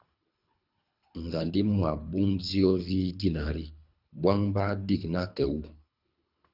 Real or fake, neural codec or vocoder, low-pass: fake; codec, 24 kHz, 3 kbps, HILCodec; 5.4 kHz